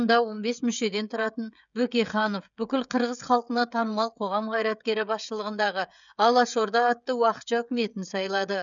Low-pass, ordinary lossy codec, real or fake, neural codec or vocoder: 7.2 kHz; none; fake; codec, 16 kHz, 16 kbps, FreqCodec, smaller model